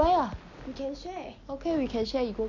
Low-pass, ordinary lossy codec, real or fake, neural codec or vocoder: 7.2 kHz; none; real; none